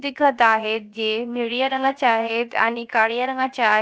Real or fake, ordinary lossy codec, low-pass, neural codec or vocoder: fake; none; none; codec, 16 kHz, 0.7 kbps, FocalCodec